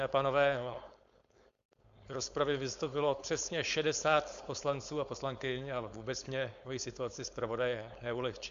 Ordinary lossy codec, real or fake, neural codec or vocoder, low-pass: Opus, 64 kbps; fake; codec, 16 kHz, 4.8 kbps, FACodec; 7.2 kHz